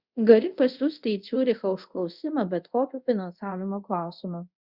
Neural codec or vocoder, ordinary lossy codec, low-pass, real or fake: codec, 24 kHz, 0.5 kbps, DualCodec; Opus, 64 kbps; 5.4 kHz; fake